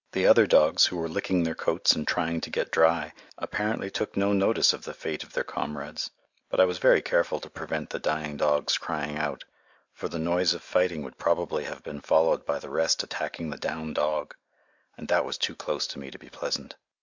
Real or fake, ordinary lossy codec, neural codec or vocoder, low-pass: real; MP3, 64 kbps; none; 7.2 kHz